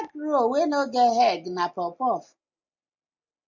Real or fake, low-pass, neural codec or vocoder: real; 7.2 kHz; none